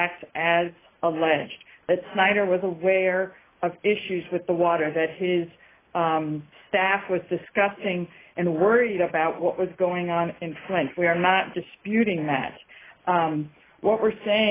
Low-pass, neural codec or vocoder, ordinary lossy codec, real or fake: 3.6 kHz; none; AAC, 16 kbps; real